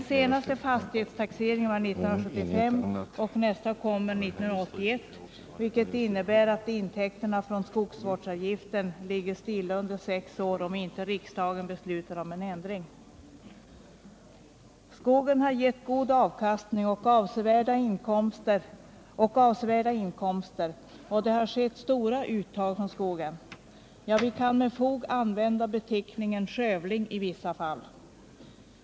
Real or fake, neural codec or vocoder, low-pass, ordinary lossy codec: real; none; none; none